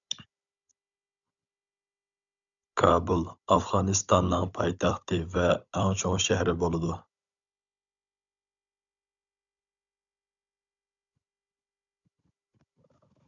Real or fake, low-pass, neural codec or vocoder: fake; 7.2 kHz; codec, 16 kHz, 16 kbps, FunCodec, trained on Chinese and English, 50 frames a second